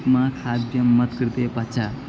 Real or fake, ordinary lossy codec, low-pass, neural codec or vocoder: real; none; none; none